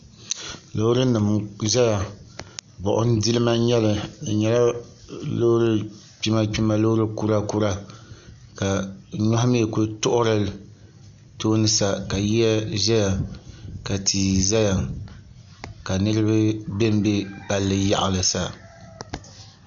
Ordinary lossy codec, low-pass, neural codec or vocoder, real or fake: MP3, 96 kbps; 7.2 kHz; none; real